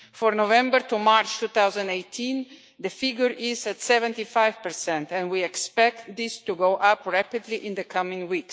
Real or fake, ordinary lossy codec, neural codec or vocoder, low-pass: fake; none; codec, 16 kHz, 6 kbps, DAC; none